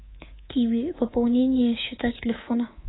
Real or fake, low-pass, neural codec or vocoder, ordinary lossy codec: fake; 7.2 kHz; codec, 24 kHz, 3.1 kbps, DualCodec; AAC, 16 kbps